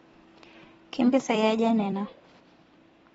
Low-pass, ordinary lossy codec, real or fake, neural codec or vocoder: 19.8 kHz; AAC, 24 kbps; fake; vocoder, 44.1 kHz, 128 mel bands every 256 samples, BigVGAN v2